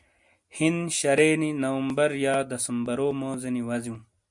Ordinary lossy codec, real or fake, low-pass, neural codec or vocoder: MP3, 64 kbps; real; 10.8 kHz; none